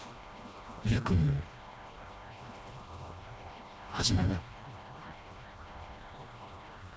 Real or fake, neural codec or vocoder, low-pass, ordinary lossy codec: fake; codec, 16 kHz, 1 kbps, FreqCodec, smaller model; none; none